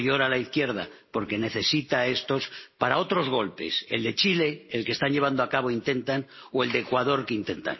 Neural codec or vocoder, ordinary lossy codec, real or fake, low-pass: none; MP3, 24 kbps; real; 7.2 kHz